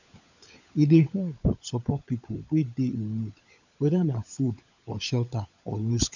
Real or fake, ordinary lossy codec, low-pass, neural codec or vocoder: fake; none; 7.2 kHz; codec, 16 kHz, 16 kbps, FunCodec, trained on LibriTTS, 50 frames a second